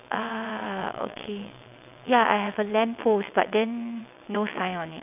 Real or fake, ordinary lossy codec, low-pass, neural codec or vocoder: fake; none; 3.6 kHz; vocoder, 22.05 kHz, 80 mel bands, WaveNeXt